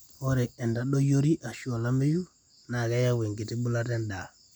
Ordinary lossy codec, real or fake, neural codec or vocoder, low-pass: none; real; none; none